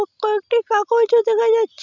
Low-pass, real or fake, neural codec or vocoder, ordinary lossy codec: 7.2 kHz; real; none; none